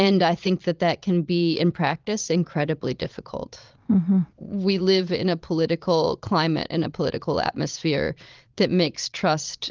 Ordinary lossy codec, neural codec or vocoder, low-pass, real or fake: Opus, 24 kbps; vocoder, 22.05 kHz, 80 mel bands, Vocos; 7.2 kHz; fake